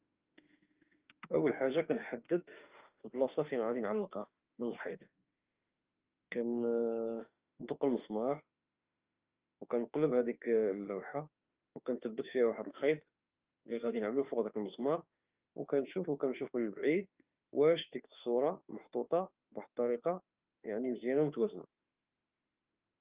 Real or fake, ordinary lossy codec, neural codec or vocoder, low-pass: fake; Opus, 24 kbps; autoencoder, 48 kHz, 32 numbers a frame, DAC-VAE, trained on Japanese speech; 3.6 kHz